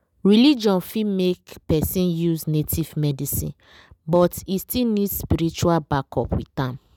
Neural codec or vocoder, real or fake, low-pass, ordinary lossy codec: none; real; none; none